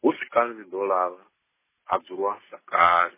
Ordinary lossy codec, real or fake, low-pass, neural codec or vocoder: MP3, 16 kbps; real; 3.6 kHz; none